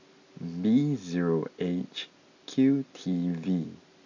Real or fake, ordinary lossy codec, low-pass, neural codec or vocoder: real; MP3, 64 kbps; 7.2 kHz; none